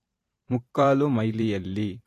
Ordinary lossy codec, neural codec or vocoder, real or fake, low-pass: AAC, 48 kbps; vocoder, 44.1 kHz, 128 mel bands every 512 samples, BigVGAN v2; fake; 14.4 kHz